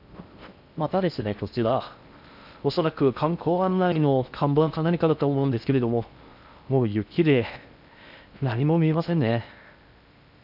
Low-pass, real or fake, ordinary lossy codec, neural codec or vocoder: 5.4 kHz; fake; none; codec, 16 kHz in and 24 kHz out, 0.6 kbps, FocalCodec, streaming, 4096 codes